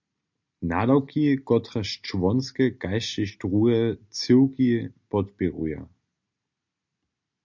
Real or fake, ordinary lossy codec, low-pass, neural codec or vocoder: real; MP3, 64 kbps; 7.2 kHz; none